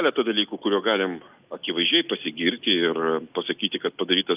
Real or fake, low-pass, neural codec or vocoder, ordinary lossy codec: real; 3.6 kHz; none; Opus, 24 kbps